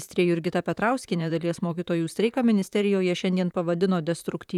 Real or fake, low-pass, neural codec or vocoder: fake; 19.8 kHz; vocoder, 44.1 kHz, 128 mel bands, Pupu-Vocoder